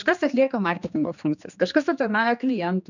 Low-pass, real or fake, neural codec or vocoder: 7.2 kHz; fake; codec, 16 kHz, 2 kbps, X-Codec, HuBERT features, trained on general audio